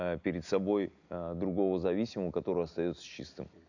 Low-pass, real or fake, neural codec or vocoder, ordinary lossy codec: 7.2 kHz; real; none; none